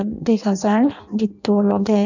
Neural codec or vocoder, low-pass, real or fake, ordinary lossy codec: codec, 16 kHz in and 24 kHz out, 0.6 kbps, FireRedTTS-2 codec; 7.2 kHz; fake; none